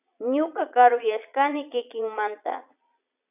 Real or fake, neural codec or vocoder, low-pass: fake; vocoder, 22.05 kHz, 80 mel bands, Vocos; 3.6 kHz